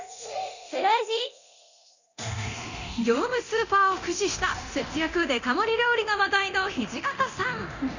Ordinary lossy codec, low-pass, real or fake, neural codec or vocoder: none; 7.2 kHz; fake; codec, 24 kHz, 0.9 kbps, DualCodec